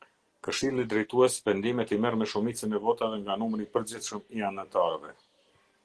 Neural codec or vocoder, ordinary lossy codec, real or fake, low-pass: none; Opus, 16 kbps; real; 10.8 kHz